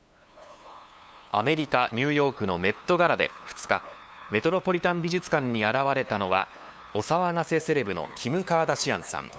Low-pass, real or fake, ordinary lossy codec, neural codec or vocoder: none; fake; none; codec, 16 kHz, 2 kbps, FunCodec, trained on LibriTTS, 25 frames a second